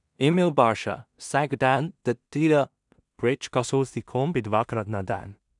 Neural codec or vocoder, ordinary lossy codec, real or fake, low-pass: codec, 16 kHz in and 24 kHz out, 0.4 kbps, LongCat-Audio-Codec, two codebook decoder; none; fake; 10.8 kHz